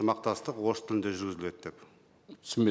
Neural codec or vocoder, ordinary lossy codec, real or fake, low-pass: none; none; real; none